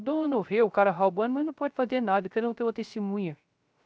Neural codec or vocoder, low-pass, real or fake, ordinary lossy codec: codec, 16 kHz, 0.3 kbps, FocalCodec; none; fake; none